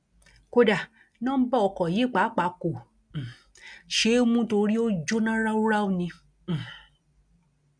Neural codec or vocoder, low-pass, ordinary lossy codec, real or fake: none; 9.9 kHz; none; real